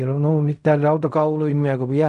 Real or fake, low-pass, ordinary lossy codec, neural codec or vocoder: fake; 10.8 kHz; none; codec, 16 kHz in and 24 kHz out, 0.4 kbps, LongCat-Audio-Codec, fine tuned four codebook decoder